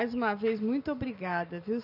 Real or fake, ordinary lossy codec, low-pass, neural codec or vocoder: real; none; 5.4 kHz; none